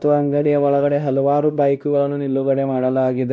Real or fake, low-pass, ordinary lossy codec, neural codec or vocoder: fake; none; none; codec, 16 kHz, 1 kbps, X-Codec, WavLM features, trained on Multilingual LibriSpeech